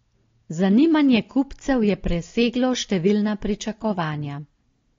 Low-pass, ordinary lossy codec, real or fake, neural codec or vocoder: 7.2 kHz; AAC, 32 kbps; real; none